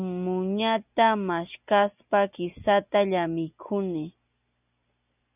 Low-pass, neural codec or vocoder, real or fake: 3.6 kHz; none; real